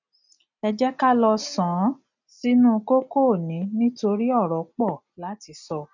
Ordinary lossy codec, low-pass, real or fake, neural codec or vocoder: none; 7.2 kHz; real; none